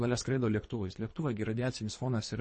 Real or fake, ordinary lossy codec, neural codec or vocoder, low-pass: fake; MP3, 32 kbps; codec, 24 kHz, 3 kbps, HILCodec; 10.8 kHz